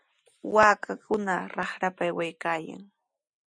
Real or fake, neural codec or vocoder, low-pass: real; none; 9.9 kHz